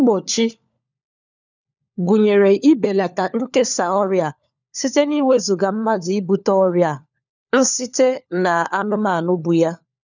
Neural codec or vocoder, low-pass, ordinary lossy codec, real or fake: codec, 16 kHz, 4 kbps, FunCodec, trained on LibriTTS, 50 frames a second; 7.2 kHz; none; fake